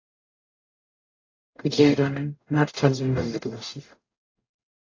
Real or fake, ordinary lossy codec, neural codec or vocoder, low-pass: fake; AAC, 32 kbps; codec, 44.1 kHz, 0.9 kbps, DAC; 7.2 kHz